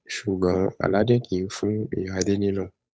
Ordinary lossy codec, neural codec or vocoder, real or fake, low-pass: none; codec, 16 kHz, 8 kbps, FunCodec, trained on Chinese and English, 25 frames a second; fake; none